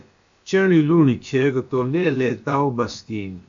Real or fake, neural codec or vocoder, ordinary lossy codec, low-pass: fake; codec, 16 kHz, about 1 kbps, DyCAST, with the encoder's durations; AAC, 64 kbps; 7.2 kHz